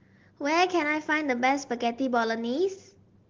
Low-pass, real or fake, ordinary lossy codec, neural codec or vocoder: 7.2 kHz; real; Opus, 16 kbps; none